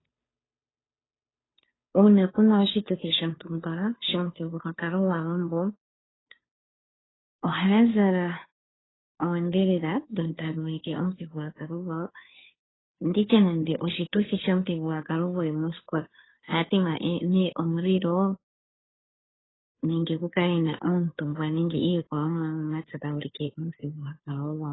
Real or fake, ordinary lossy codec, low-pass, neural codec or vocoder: fake; AAC, 16 kbps; 7.2 kHz; codec, 16 kHz, 2 kbps, FunCodec, trained on Chinese and English, 25 frames a second